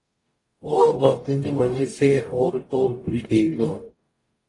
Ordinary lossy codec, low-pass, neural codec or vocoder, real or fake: AAC, 32 kbps; 10.8 kHz; codec, 44.1 kHz, 0.9 kbps, DAC; fake